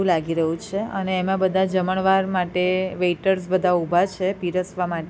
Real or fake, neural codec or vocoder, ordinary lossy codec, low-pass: real; none; none; none